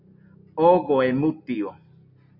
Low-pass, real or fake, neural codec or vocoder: 5.4 kHz; real; none